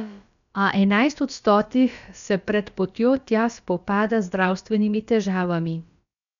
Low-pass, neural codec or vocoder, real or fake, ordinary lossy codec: 7.2 kHz; codec, 16 kHz, about 1 kbps, DyCAST, with the encoder's durations; fake; none